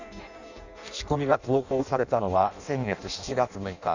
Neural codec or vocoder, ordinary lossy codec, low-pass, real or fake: codec, 16 kHz in and 24 kHz out, 0.6 kbps, FireRedTTS-2 codec; none; 7.2 kHz; fake